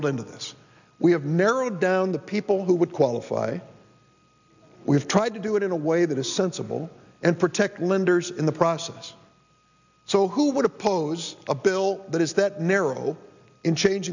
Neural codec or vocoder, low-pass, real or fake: none; 7.2 kHz; real